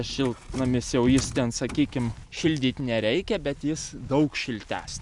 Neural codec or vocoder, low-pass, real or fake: none; 10.8 kHz; real